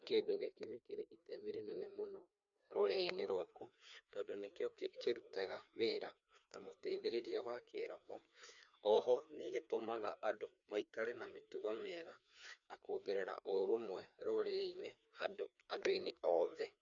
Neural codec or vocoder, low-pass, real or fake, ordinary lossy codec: codec, 16 kHz, 2 kbps, FreqCodec, larger model; 7.2 kHz; fake; MP3, 64 kbps